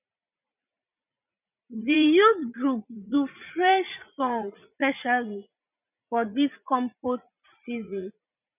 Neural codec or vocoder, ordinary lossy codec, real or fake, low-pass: vocoder, 44.1 kHz, 80 mel bands, Vocos; none; fake; 3.6 kHz